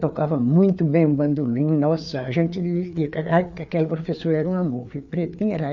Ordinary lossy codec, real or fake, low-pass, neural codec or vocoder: none; fake; 7.2 kHz; codec, 16 kHz, 4 kbps, FreqCodec, larger model